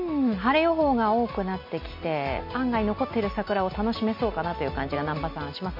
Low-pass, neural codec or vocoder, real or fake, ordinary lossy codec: 5.4 kHz; none; real; MP3, 32 kbps